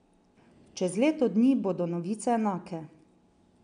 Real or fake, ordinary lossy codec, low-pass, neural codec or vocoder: real; none; 9.9 kHz; none